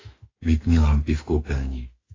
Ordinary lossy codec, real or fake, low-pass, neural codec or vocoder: AAC, 32 kbps; fake; 7.2 kHz; autoencoder, 48 kHz, 32 numbers a frame, DAC-VAE, trained on Japanese speech